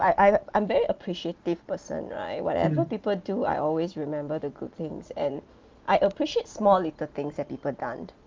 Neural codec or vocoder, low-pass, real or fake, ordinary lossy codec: none; 7.2 kHz; real; Opus, 24 kbps